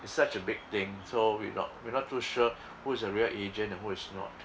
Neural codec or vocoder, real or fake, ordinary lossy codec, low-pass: none; real; none; none